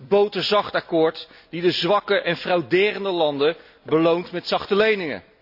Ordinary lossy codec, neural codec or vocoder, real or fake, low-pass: AAC, 48 kbps; none; real; 5.4 kHz